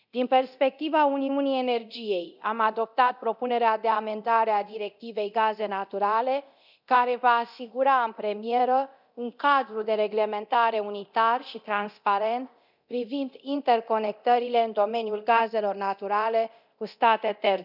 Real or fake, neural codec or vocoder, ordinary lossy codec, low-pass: fake; codec, 24 kHz, 0.9 kbps, DualCodec; none; 5.4 kHz